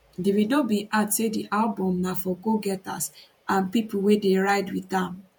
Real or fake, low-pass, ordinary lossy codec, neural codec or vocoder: fake; 19.8 kHz; MP3, 96 kbps; vocoder, 48 kHz, 128 mel bands, Vocos